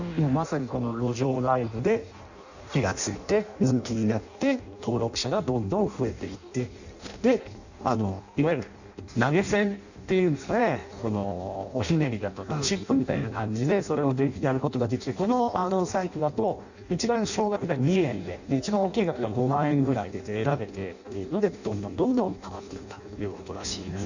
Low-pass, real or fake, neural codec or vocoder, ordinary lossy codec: 7.2 kHz; fake; codec, 16 kHz in and 24 kHz out, 0.6 kbps, FireRedTTS-2 codec; none